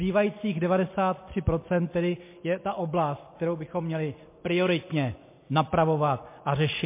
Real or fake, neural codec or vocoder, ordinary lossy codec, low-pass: real; none; MP3, 24 kbps; 3.6 kHz